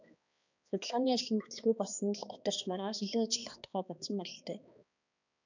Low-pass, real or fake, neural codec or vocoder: 7.2 kHz; fake; codec, 16 kHz, 2 kbps, X-Codec, HuBERT features, trained on balanced general audio